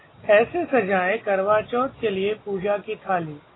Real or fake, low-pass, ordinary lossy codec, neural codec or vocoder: real; 7.2 kHz; AAC, 16 kbps; none